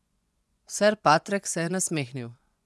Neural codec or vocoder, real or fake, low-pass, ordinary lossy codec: vocoder, 24 kHz, 100 mel bands, Vocos; fake; none; none